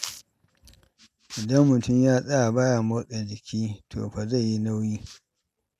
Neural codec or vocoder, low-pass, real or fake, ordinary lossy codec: none; 14.4 kHz; real; none